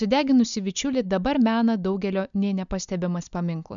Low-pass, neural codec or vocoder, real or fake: 7.2 kHz; none; real